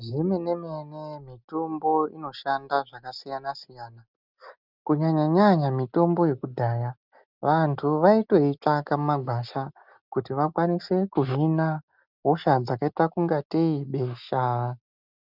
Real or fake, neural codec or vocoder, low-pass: real; none; 5.4 kHz